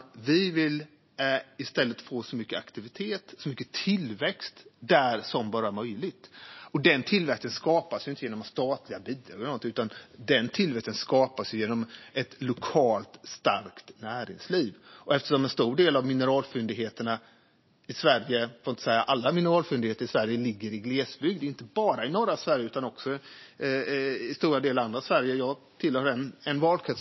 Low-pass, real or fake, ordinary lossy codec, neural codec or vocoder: 7.2 kHz; real; MP3, 24 kbps; none